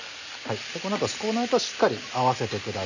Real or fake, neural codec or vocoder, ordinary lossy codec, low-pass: real; none; none; 7.2 kHz